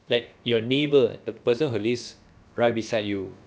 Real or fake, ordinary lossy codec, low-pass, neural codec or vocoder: fake; none; none; codec, 16 kHz, about 1 kbps, DyCAST, with the encoder's durations